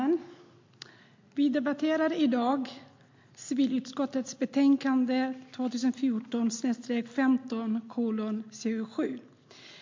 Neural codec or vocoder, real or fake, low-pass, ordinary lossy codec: none; real; 7.2 kHz; MP3, 48 kbps